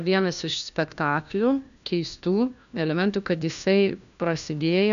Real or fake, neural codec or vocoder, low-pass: fake; codec, 16 kHz, 1 kbps, FunCodec, trained on LibriTTS, 50 frames a second; 7.2 kHz